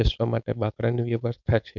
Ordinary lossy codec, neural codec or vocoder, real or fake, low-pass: none; codec, 16 kHz, 4.8 kbps, FACodec; fake; 7.2 kHz